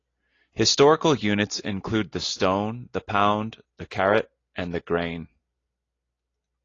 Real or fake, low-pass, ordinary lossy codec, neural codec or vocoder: real; 7.2 kHz; AAC, 32 kbps; none